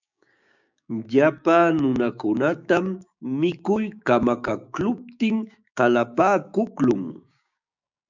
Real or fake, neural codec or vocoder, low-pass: fake; codec, 16 kHz, 6 kbps, DAC; 7.2 kHz